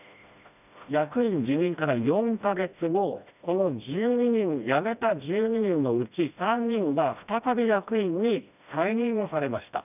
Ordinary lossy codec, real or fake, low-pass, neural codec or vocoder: none; fake; 3.6 kHz; codec, 16 kHz, 1 kbps, FreqCodec, smaller model